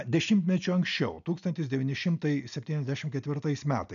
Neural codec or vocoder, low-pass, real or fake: none; 7.2 kHz; real